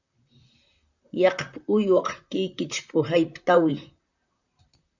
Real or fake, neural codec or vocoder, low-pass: fake; vocoder, 44.1 kHz, 128 mel bands, Pupu-Vocoder; 7.2 kHz